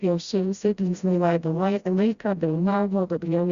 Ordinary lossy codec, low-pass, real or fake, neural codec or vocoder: Opus, 64 kbps; 7.2 kHz; fake; codec, 16 kHz, 0.5 kbps, FreqCodec, smaller model